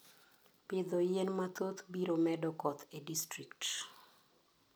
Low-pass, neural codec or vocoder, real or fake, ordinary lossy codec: none; none; real; none